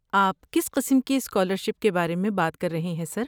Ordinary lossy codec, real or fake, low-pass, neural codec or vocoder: none; real; none; none